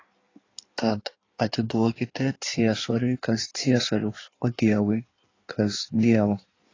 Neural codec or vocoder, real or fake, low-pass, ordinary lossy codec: codec, 16 kHz in and 24 kHz out, 1.1 kbps, FireRedTTS-2 codec; fake; 7.2 kHz; AAC, 32 kbps